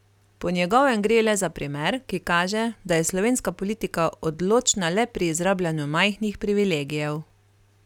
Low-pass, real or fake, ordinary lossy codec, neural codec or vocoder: 19.8 kHz; real; none; none